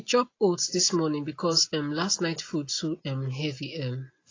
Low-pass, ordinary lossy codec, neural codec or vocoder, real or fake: 7.2 kHz; AAC, 32 kbps; none; real